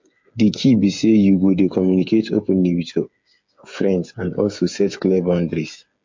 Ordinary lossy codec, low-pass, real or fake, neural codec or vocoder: MP3, 48 kbps; 7.2 kHz; fake; codec, 16 kHz, 8 kbps, FreqCodec, smaller model